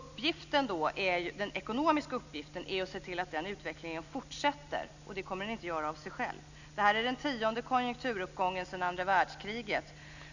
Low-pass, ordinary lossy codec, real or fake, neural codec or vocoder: 7.2 kHz; none; real; none